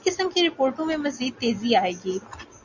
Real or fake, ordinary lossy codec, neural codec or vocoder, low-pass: real; Opus, 64 kbps; none; 7.2 kHz